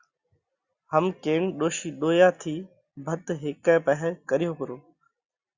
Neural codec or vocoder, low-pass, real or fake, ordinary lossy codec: none; 7.2 kHz; real; Opus, 64 kbps